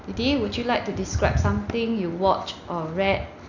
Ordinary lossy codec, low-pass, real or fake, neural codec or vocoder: none; 7.2 kHz; real; none